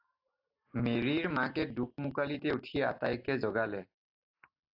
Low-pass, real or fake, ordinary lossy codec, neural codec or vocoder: 5.4 kHz; real; AAC, 48 kbps; none